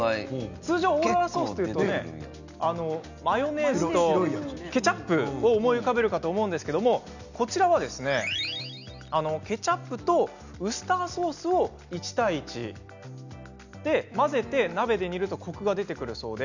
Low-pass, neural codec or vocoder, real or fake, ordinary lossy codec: 7.2 kHz; none; real; none